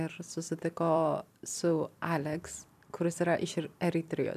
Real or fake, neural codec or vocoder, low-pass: fake; vocoder, 44.1 kHz, 128 mel bands every 256 samples, BigVGAN v2; 14.4 kHz